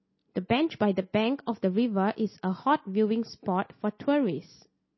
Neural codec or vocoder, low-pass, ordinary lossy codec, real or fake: none; 7.2 kHz; MP3, 24 kbps; real